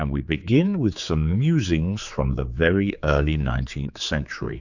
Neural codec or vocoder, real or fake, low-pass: codec, 16 kHz, 4 kbps, X-Codec, HuBERT features, trained on general audio; fake; 7.2 kHz